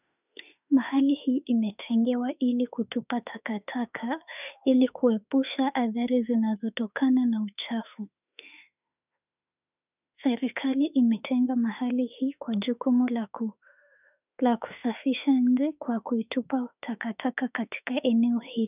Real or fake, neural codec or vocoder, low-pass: fake; autoencoder, 48 kHz, 32 numbers a frame, DAC-VAE, trained on Japanese speech; 3.6 kHz